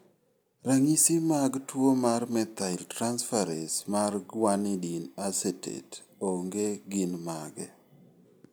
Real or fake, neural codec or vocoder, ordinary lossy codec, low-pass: real; none; none; none